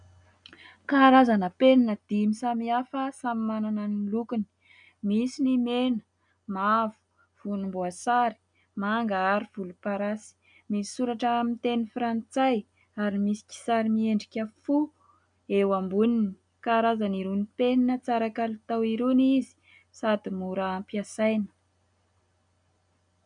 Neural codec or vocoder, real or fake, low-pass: none; real; 9.9 kHz